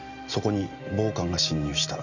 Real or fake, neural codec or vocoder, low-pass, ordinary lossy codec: real; none; 7.2 kHz; Opus, 64 kbps